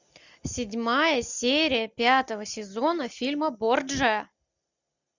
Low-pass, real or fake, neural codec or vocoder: 7.2 kHz; real; none